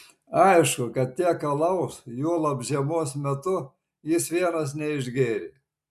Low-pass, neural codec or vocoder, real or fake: 14.4 kHz; none; real